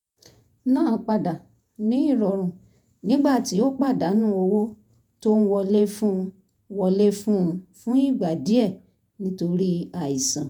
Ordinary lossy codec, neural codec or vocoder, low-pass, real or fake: none; vocoder, 48 kHz, 128 mel bands, Vocos; 19.8 kHz; fake